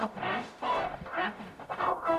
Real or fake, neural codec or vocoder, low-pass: fake; codec, 44.1 kHz, 0.9 kbps, DAC; 14.4 kHz